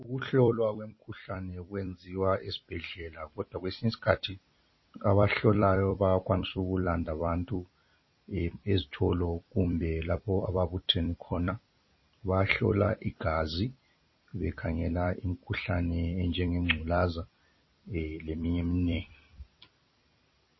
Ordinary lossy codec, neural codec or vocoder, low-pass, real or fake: MP3, 24 kbps; vocoder, 44.1 kHz, 128 mel bands every 256 samples, BigVGAN v2; 7.2 kHz; fake